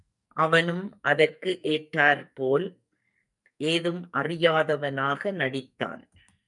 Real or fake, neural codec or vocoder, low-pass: fake; codec, 44.1 kHz, 2.6 kbps, SNAC; 10.8 kHz